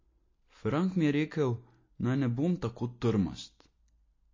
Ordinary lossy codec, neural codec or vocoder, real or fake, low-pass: MP3, 32 kbps; none; real; 7.2 kHz